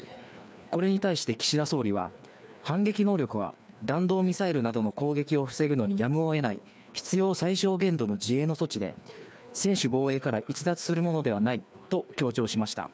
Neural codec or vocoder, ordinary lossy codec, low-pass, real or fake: codec, 16 kHz, 2 kbps, FreqCodec, larger model; none; none; fake